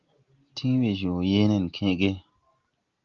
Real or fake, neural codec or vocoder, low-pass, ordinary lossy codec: real; none; 7.2 kHz; Opus, 32 kbps